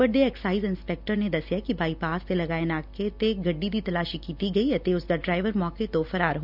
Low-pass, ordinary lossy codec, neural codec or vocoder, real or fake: 5.4 kHz; none; none; real